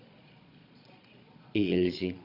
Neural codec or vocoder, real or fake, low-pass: vocoder, 22.05 kHz, 80 mel bands, Vocos; fake; 5.4 kHz